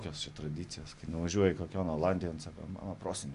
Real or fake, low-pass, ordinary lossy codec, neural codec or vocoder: real; 10.8 kHz; MP3, 64 kbps; none